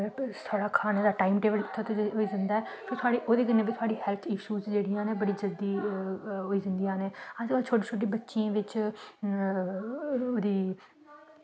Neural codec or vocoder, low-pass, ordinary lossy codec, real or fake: none; none; none; real